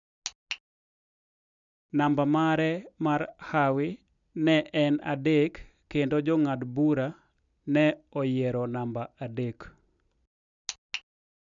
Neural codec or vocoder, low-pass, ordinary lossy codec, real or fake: none; 7.2 kHz; none; real